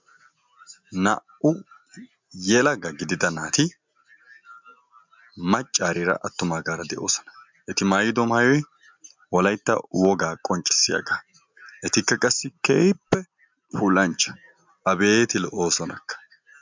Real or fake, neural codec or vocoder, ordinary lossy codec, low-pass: real; none; MP3, 64 kbps; 7.2 kHz